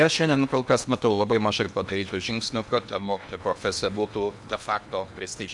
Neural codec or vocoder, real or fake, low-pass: codec, 16 kHz in and 24 kHz out, 0.8 kbps, FocalCodec, streaming, 65536 codes; fake; 10.8 kHz